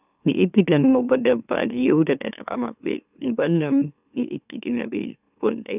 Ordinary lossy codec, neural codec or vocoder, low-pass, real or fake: none; autoencoder, 44.1 kHz, a latent of 192 numbers a frame, MeloTTS; 3.6 kHz; fake